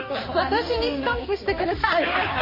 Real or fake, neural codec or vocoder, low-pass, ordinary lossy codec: fake; codec, 16 kHz, 2 kbps, X-Codec, HuBERT features, trained on balanced general audio; 5.4 kHz; MP3, 24 kbps